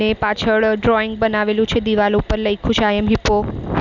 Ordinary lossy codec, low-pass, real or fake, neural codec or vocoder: none; 7.2 kHz; real; none